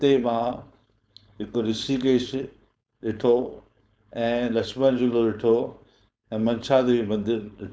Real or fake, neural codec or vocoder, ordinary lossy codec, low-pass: fake; codec, 16 kHz, 4.8 kbps, FACodec; none; none